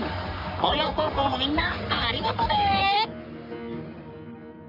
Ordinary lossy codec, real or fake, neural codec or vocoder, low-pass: none; fake; codec, 44.1 kHz, 3.4 kbps, Pupu-Codec; 5.4 kHz